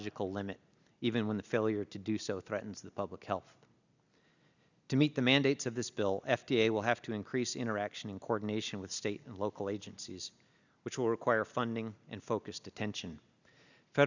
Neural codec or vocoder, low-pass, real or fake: none; 7.2 kHz; real